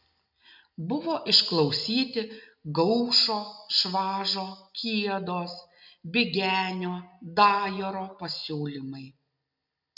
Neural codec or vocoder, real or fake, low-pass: none; real; 5.4 kHz